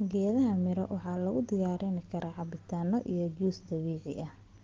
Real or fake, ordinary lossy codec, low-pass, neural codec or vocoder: real; Opus, 16 kbps; 7.2 kHz; none